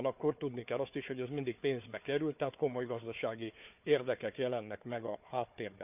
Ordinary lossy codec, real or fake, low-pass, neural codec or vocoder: none; fake; 3.6 kHz; codec, 16 kHz, 4 kbps, FunCodec, trained on Chinese and English, 50 frames a second